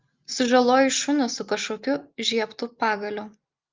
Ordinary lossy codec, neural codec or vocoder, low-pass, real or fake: Opus, 32 kbps; none; 7.2 kHz; real